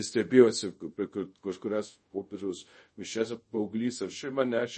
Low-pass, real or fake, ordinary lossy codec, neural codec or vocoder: 10.8 kHz; fake; MP3, 32 kbps; codec, 24 kHz, 0.5 kbps, DualCodec